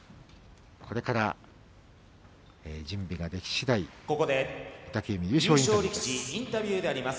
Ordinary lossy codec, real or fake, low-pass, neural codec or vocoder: none; real; none; none